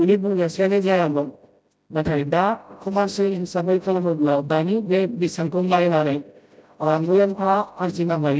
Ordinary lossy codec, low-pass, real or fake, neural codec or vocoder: none; none; fake; codec, 16 kHz, 0.5 kbps, FreqCodec, smaller model